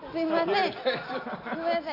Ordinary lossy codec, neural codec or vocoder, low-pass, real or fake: none; none; 5.4 kHz; real